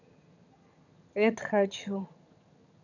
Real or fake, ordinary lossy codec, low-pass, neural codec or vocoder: fake; none; 7.2 kHz; vocoder, 22.05 kHz, 80 mel bands, HiFi-GAN